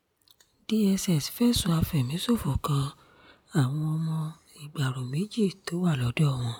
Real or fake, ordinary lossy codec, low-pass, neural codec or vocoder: real; none; none; none